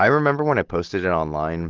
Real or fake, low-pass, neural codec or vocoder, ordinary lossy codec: real; 7.2 kHz; none; Opus, 16 kbps